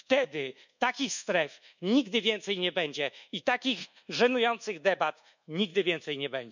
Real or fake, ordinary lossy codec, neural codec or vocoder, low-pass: fake; none; codec, 24 kHz, 1.2 kbps, DualCodec; 7.2 kHz